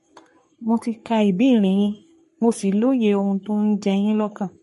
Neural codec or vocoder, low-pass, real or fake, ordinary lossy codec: codec, 44.1 kHz, 3.4 kbps, Pupu-Codec; 14.4 kHz; fake; MP3, 48 kbps